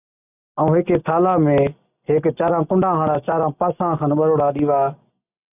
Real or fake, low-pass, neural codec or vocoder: real; 3.6 kHz; none